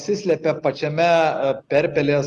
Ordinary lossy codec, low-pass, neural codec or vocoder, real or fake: Opus, 24 kbps; 10.8 kHz; none; real